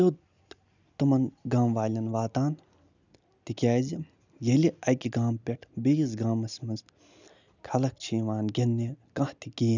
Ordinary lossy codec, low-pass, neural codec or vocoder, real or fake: none; 7.2 kHz; none; real